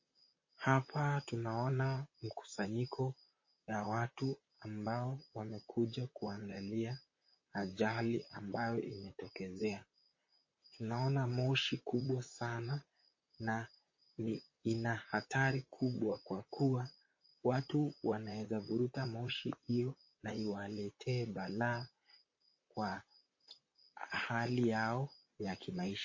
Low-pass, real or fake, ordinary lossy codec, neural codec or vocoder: 7.2 kHz; fake; MP3, 32 kbps; vocoder, 44.1 kHz, 128 mel bands, Pupu-Vocoder